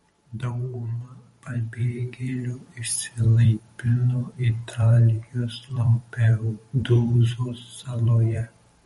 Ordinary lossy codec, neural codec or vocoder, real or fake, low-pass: MP3, 48 kbps; vocoder, 44.1 kHz, 128 mel bands every 512 samples, BigVGAN v2; fake; 19.8 kHz